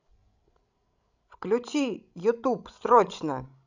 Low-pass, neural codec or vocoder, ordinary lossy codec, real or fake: 7.2 kHz; codec, 16 kHz, 16 kbps, FreqCodec, larger model; none; fake